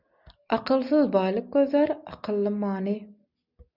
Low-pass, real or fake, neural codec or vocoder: 5.4 kHz; real; none